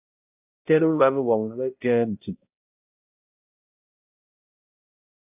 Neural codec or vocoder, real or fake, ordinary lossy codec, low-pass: codec, 16 kHz, 0.5 kbps, X-Codec, HuBERT features, trained on balanced general audio; fake; AAC, 32 kbps; 3.6 kHz